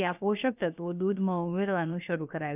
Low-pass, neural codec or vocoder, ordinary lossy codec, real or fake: 3.6 kHz; codec, 16 kHz, about 1 kbps, DyCAST, with the encoder's durations; none; fake